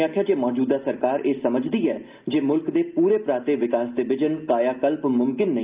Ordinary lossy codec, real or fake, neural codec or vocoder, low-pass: Opus, 32 kbps; real; none; 3.6 kHz